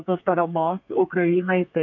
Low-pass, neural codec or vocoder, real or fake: 7.2 kHz; codec, 32 kHz, 1.9 kbps, SNAC; fake